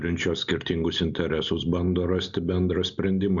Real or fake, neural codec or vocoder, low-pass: real; none; 7.2 kHz